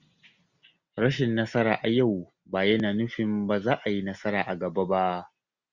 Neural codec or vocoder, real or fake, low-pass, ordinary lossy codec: none; real; 7.2 kHz; Opus, 64 kbps